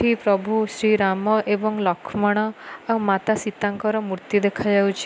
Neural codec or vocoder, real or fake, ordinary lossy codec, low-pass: none; real; none; none